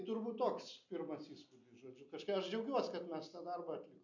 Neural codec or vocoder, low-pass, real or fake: none; 7.2 kHz; real